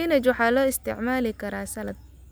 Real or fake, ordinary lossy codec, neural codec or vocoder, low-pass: real; none; none; none